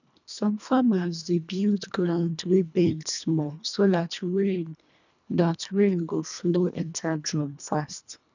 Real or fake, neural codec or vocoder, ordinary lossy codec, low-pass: fake; codec, 24 kHz, 1.5 kbps, HILCodec; none; 7.2 kHz